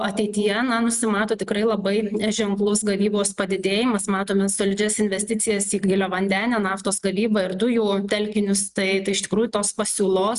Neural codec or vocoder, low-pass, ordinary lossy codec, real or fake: none; 10.8 kHz; Opus, 64 kbps; real